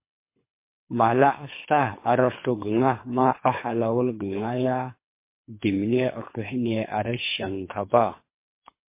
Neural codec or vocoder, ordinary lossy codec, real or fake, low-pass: codec, 24 kHz, 3 kbps, HILCodec; MP3, 24 kbps; fake; 3.6 kHz